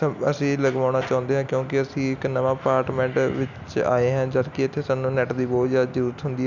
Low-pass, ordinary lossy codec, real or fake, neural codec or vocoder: 7.2 kHz; none; real; none